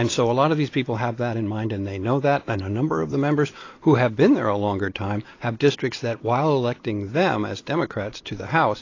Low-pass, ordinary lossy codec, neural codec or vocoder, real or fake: 7.2 kHz; AAC, 32 kbps; vocoder, 44.1 kHz, 128 mel bands every 256 samples, BigVGAN v2; fake